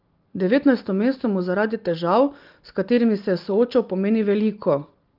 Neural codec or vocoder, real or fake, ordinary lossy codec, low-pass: none; real; Opus, 24 kbps; 5.4 kHz